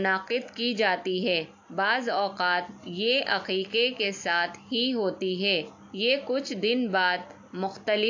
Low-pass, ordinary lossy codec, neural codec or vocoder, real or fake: 7.2 kHz; AAC, 48 kbps; none; real